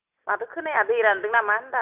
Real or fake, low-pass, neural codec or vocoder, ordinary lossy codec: real; 3.6 kHz; none; none